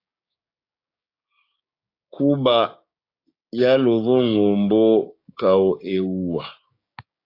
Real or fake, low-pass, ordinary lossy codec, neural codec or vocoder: fake; 5.4 kHz; AAC, 32 kbps; codec, 16 kHz, 6 kbps, DAC